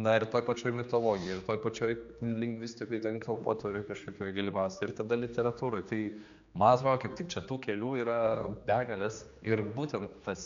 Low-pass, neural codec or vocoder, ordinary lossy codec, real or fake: 7.2 kHz; codec, 16 kHz, 2 kbps, X-Codec, HuBERT features, trained on general audio; MP3, 64 kbps; fake